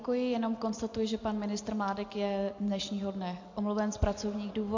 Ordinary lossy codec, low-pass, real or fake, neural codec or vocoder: MP3, 48 kbps; 7.2 kHz; real; none